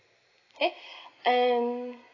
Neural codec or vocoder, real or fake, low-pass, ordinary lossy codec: none; real; 7.2 kHz; AAC, 32 kbps